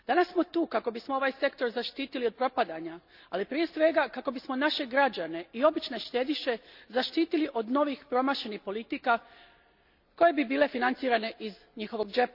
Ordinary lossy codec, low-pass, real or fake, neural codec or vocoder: none; 5.4 kHz; real; none